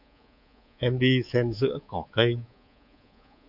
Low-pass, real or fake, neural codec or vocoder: 5.4 kHz; fake; codec, 24 kHz, 3.1 kbps, DualCodec